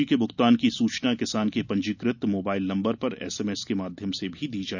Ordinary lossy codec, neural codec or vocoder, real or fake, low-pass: none; none; real; 7.2 kHz